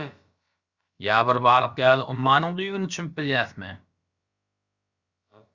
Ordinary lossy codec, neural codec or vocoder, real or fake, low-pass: Opus, 64 kbps; codec, 16 kHz, about 1 kbps, DyCAST, with the encoder's durations; fake; 7.2 kHz